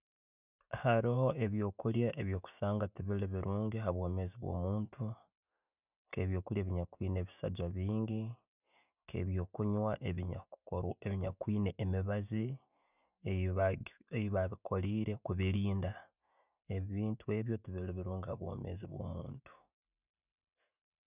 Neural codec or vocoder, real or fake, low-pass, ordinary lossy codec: none; real; 3.6 kHz; none